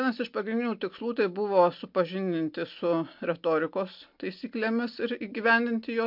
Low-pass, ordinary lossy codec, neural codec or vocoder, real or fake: 5.4 kHz; MP3, 48 kbps; none; real